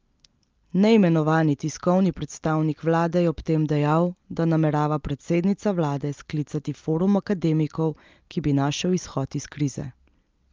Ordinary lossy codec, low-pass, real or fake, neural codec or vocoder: Opus, 24 kbps; 7.2 kHz; real; none